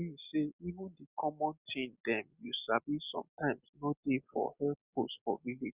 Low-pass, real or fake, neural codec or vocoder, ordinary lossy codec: 3.6 kHz; real; none; Opus, 64 kbps